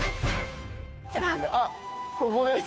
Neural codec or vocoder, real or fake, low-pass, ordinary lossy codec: codec, 16 kHz, 2 kbps, FunCodec, trained on Chinese and English, 25 frames a second; fake; none; none